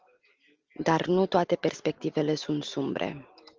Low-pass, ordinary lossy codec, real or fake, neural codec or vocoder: 7.2 kHz; Opus, 32 kbps; real; none